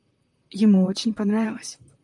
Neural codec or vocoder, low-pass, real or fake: vocoder, 44.1 kHz, 128 mel bands, Pupu-Vocoder; 10.8 kHz; fake